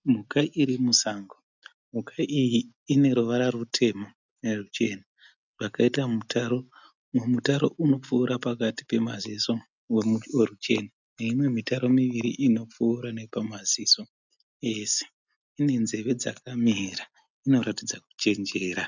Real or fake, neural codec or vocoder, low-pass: real; none; 7.2 kHz